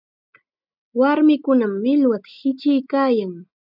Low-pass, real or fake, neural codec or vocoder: 5.4 kHz; real; none